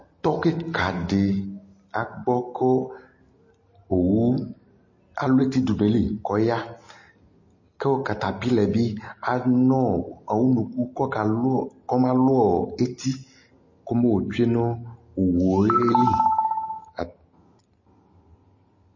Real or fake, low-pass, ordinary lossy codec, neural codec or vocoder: real; 7.2 kHz; MP3, 32 kbps; none